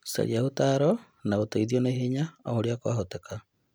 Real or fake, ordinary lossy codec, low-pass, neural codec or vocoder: real; none; none; none